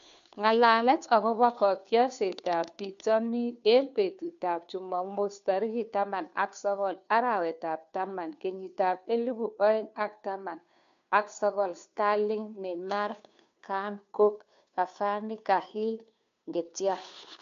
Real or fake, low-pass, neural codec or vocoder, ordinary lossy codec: fake; 7.2 kHz; codec, 16 kHz, 2 kbps, FunCodec, trained on Chinese and English, 25 frames a second; MP3, 64 kbps